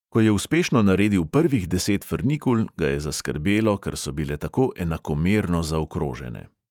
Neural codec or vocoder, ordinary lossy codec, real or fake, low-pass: vocoder, 48 kHz, 128 mel bands, Vocos; none; fake; 19.8 kHz